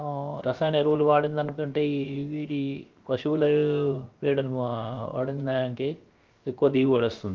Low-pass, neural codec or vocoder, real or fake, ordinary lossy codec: 7.2 kHz; codec, 16 kHz, about 1 kbps, DyCAST, with the encoder's durations; fake; Opus, 32 kbps